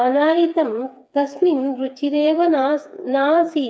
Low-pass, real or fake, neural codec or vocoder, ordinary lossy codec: none; fake; codec, 16 kHz, 4 kbps, FreqCodec, smaller model; none